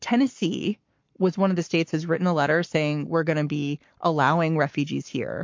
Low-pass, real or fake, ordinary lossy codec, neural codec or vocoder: 7.2 kHz; fake; MP3, 48 kbps; codec, 44.1 kHz, 7.8 kbps, Pupu-Codec